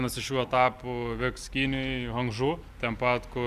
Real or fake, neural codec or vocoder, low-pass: real; none; 14.4 kHz